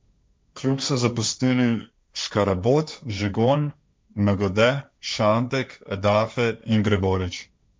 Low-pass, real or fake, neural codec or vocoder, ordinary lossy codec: none; fake; codec, 16 kHz, 1.1 kbps, Voila-Tokenizer; none